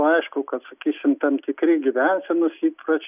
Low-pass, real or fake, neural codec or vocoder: 3.6 kHz; real; none